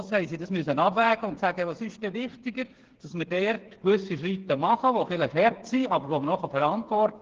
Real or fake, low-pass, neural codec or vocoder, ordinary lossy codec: fake; 7.2 kHz; codec, 16 kHz, 4 kbps, FreqCodec, smaller model; Opus, 16 kbps